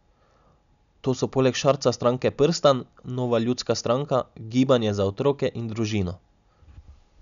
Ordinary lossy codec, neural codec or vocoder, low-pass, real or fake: none; none; 7.2 kHz; real